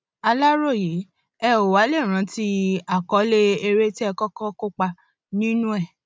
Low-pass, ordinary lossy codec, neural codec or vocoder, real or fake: none; none; none; real